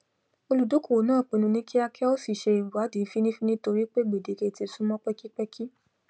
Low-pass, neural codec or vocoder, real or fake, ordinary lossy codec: none; none; real; none